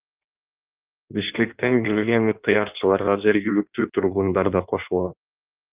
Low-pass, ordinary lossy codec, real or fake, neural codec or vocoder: 3.6 kHz; Opus, 32 kbps; fake; codec, 16 kHz in and 24 kHz out, 1.1 kbps, FireRedTTS-2 codec